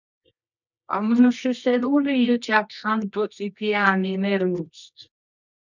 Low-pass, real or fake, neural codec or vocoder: 7.2 kHz; fake; codec, 24 kHz, 0.9 kbps, WavTokenizer, medium music audio release